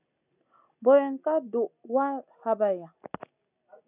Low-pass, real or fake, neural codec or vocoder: 3.6 kHz; real; none